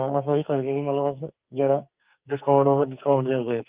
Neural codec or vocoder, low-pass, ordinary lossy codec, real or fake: codec, 44.1 kHz, 2.6 kbps, SNAC; 3.6 kHz; Opus, 24 kbps; fake